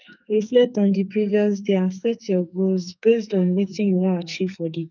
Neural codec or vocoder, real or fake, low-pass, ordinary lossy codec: codec, 44.1 kHz, 2.6 kbps, SNAC; fake; 7.2 kHz; none